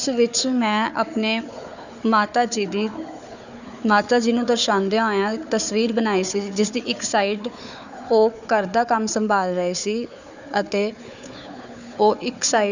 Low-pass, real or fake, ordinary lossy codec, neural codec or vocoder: 7.2 kHz; fake; none; codec, 16 kHz, 4 kbps, FunCodec, trained on Chinese and English, 50 frames a second